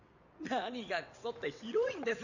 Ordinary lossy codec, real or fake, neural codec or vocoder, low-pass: none; fake; vocoder, 22.05 kHz, 80 mel bands, WaveNeXt; 7.2 kHz